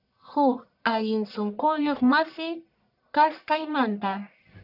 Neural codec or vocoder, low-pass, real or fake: codec, 44.1 kHz, 1.7 kbps, Pupu-Codec; 5.4 kHz; fake